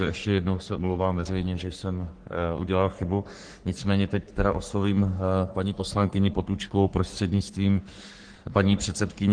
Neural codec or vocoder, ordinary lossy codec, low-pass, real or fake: codec, 44.1 kHz, 3.4 kbps, Pupu-Codec; Opus, 16 kbps; 9.9 kHz; fake